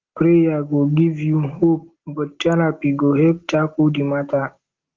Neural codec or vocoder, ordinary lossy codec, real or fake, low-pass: none; Opus, 16 kbps; real; 7.2 kHz